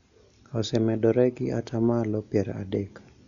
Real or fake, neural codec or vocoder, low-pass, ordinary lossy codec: real; none; 7.2 kHz; none